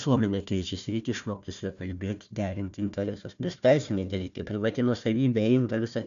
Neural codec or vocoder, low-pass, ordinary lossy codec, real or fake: codec, 16 kHz, 1 kbps, FunCodec, trained on Chinese and English, 50 frames a second; 7.2 kHz; MP3, 96 kbps; fake